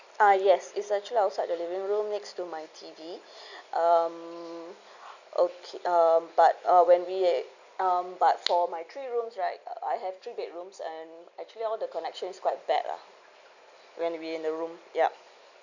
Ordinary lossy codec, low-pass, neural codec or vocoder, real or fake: none; 7.2 kHz; none; real